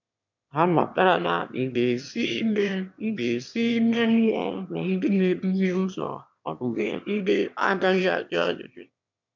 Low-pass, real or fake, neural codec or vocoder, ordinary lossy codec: 7.2 kHz; fake; autoencoder, 22.05 kHz, a latent of 192 numbers a frame, VITS, trained on one speaker; MP3, 64 kbps